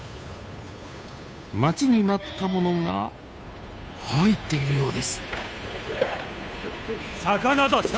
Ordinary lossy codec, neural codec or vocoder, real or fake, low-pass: none; codec, 16 kHz, 2 kbps, FunCodec, trained on Chinese and English, 25 frames a second; fake; none